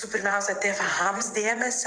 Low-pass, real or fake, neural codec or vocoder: 9.9 kHz; fake; vocoder, 22.05 kHz, 80 mel bands, WaveNeXt